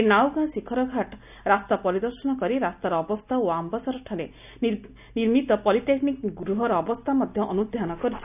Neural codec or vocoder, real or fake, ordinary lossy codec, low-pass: none; real; none; 3.6 kHz